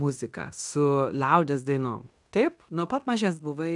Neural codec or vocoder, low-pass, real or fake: codec, 16 kHz in and 24 kHz out, 0.9 kbps, LongCat-Audio-Codec, fine tuned four codebook decoder; 10.8 kHz; fake